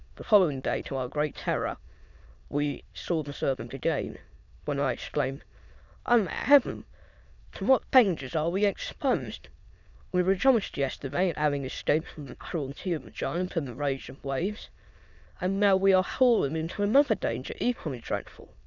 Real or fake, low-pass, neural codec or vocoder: fake; 7.2 kHz; autoencoder, 22.05 kHz, a latent of 192 numbers a frame, VITS, trained on many speakers